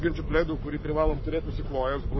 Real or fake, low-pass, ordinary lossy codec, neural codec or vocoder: fake; 7.2 kHz; MP3, 24 kbps; codec, 44.1 kHz, 7.8 kbps, Pupu-Codec